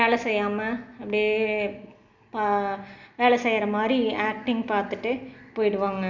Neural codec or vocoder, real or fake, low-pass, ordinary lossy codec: none; real; 7.2 kHz; none